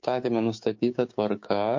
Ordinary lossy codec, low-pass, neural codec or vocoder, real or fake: MP3, 48 kbps; 7.2 kHz; codec, 16 kHz, 6 kbps, DAC; fake